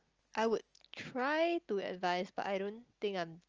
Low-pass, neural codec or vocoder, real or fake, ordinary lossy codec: 7.2 kHz; none; real; Opus, 24 kbps